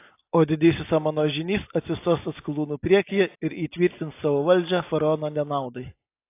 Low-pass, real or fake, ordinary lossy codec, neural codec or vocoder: 3.6 kHz; real; AAC, 24 kbps; none